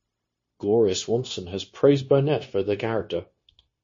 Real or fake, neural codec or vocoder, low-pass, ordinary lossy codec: fake; codec, 16 kHz, 0.9 kbps, LongCat-Audio-Codec; 7.2 kHz; MP3, 32 kbps